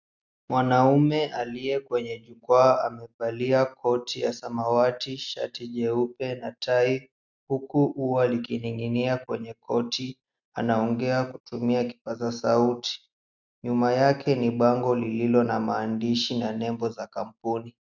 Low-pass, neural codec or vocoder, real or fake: 7.2 kHz; none; real